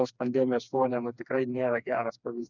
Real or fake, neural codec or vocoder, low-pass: fake; codec, 16 kHz, 2 kbps, FreqCodec, smaller model; 7.2 kHz